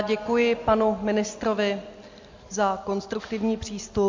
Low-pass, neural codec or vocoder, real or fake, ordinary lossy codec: 7.2 kHz; none; real; MP3, 48 kbps